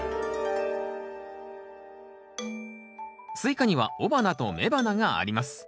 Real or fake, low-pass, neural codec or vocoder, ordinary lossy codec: real; none; none; none